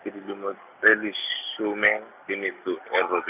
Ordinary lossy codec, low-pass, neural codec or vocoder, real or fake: none; 3.6 kHz; none; real